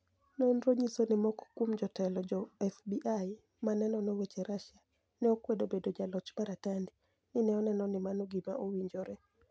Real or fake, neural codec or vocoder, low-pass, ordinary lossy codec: real; none; none; none